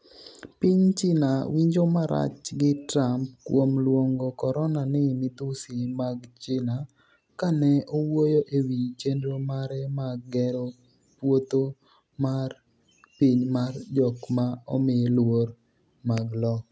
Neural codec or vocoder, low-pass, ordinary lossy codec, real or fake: none; none; none; real